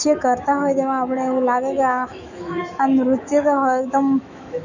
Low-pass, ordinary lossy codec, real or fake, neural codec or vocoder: 7.2 kHz; none; fake; autoencoder, 48 kHz, 128 numbers a frame, DAC-VAE, trained on Japanese speech